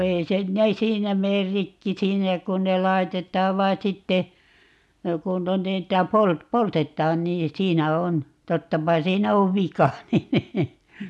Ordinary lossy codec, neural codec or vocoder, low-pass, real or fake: none; none; none; real